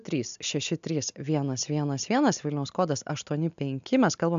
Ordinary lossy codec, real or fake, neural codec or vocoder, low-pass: AAC, 96 kbps; real; none; 7.2 kHz